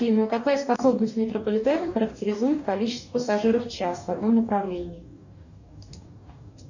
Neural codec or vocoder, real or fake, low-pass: codec, 44.1 kHz, 2.6 kbps, DAC; fake; 7.2 kHz